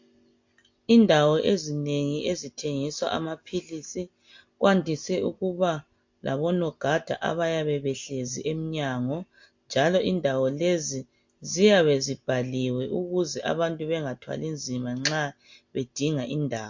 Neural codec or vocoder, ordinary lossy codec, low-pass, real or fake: none; MP3, 48 kbps; 7.2 kHz; real